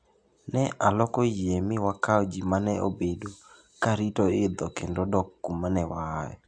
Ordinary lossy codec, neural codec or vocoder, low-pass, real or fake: AAC, 64 kbps; none; 9.9 kHz; real